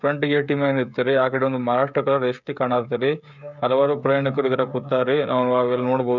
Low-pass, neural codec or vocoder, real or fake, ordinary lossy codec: 7.2 kHz; codec, 16 kHz, 16 kbps, FreqCodec, smaller model; fake; none